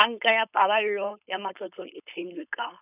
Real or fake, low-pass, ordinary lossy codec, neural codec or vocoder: fake; 3.6 kHz; none; codec, 16 kHz, 4.8 kbps, FACodec